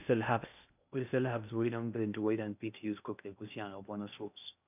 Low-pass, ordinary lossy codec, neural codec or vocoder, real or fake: 3.6 kHz; none; codec, 16 kHz in and 24 kHz out, 0.6 kbps, FocalCodec, streaming, 2048 codes; fake